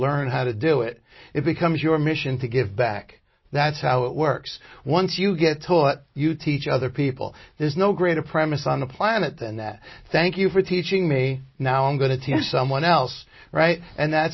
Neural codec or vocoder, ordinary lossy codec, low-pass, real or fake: none; MP3, 24 kbps; 7.2 kHz; real